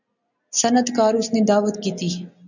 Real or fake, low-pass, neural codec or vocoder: real; 7.2 kHz; none